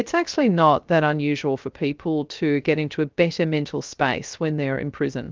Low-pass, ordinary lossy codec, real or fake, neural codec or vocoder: 7.2 kHz; Opus, 24 kbps; fake; codec, 16 kHz, 0.3 kbps, FocalCodec